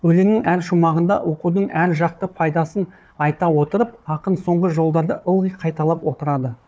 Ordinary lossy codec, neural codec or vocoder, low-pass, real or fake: none; codec, 16 kHz, 4 kbps, FunCodec, trained on Chinese and English, 50 frames a second; none; fake